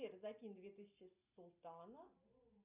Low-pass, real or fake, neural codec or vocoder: 3.6 kHz; real; none